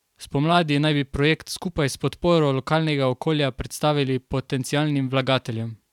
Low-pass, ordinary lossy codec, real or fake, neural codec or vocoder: 19.8 kHz; none; fake; vocoder, 44.1 kHz, 128 mel bands every 512 samples, BigVGAN v2